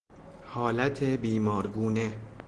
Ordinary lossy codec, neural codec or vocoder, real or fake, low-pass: Opus, 16 kbps; none; real; 9.9 kHz